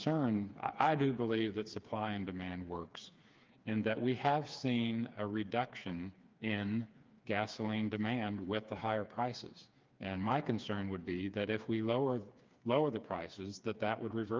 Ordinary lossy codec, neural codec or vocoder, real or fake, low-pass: Opus, 24 kbps; codec, 16 kHz, 4 kbps, FreqCodec, smaller model; fake; 7.2 kHz